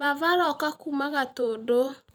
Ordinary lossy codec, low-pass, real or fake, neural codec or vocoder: none; none; fake; vocoder, 44.1 kHz, 128 mel bands every 512 samples, BigVGAN v2